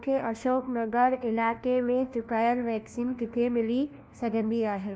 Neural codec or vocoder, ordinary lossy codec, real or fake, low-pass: codec, 16 kHz, 1 kbps, FunCodec, trained on LibriTTS, 50 frames a second; none; fake; none